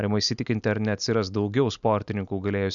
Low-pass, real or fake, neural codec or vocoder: 7.2 kHz; real; none